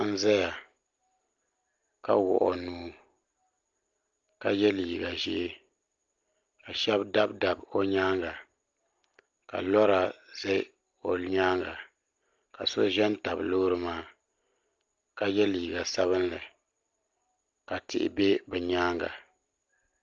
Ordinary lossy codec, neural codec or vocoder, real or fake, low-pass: Opus, 32 kbps; none; real; 7.2 kHz